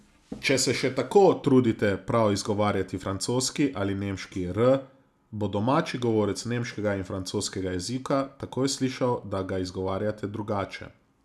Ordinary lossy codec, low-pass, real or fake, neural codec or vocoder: none; none; real; none